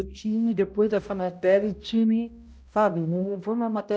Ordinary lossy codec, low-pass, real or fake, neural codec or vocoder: none; none; fake; codec, 16 kHz, 0.5 kbps, X-Codec, HuBERT features, trained on balanced general audio